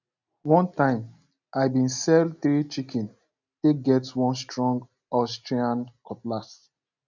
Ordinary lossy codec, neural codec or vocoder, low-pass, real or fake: none; none; 7.2 kHz; real